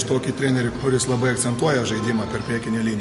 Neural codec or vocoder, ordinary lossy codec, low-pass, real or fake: none; MP3, 48 kbps; 14.4 kHz; real